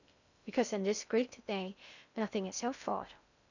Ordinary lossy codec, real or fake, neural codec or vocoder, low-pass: none; fake; codec, 16 kHz in and 24 kHz out, 0.6 kbps, FocalCodec, streaming, 2048 codes; 7.2 kHz